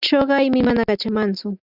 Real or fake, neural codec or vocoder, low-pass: real; none; 5.4 kHz